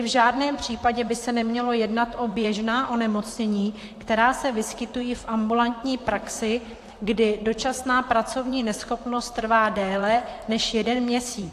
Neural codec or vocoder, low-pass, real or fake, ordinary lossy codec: vocoder, 44.1 kHz, 128 mel bands, Pupu-Vocoder; 14.4 kHz; fake; AAC, 64 kbps